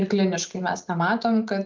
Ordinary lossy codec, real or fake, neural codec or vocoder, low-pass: Opus, 24 kbps; real; none; 7.2 kHz